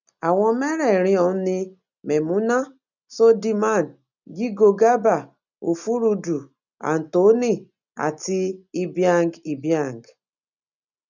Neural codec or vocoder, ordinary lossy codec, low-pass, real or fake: none; none; 7.2 kHz; real